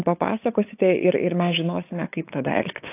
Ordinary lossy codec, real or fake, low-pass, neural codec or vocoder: AAC, 24 kbps; fake; 3.6 kHz; codec, 16 kHz, 8 kbps, FunCodec, trained on LibriTTS, 25 frames a second